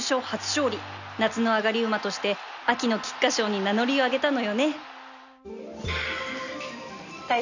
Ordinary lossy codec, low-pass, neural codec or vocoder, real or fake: none; 7.2 kHz; none; real